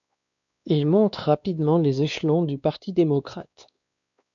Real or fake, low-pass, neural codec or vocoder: fake; 7.2 kHz; codec, 16 kHz, 2 kbps, X-Codec, WavLM features, trained on Multilingual LibriSpeech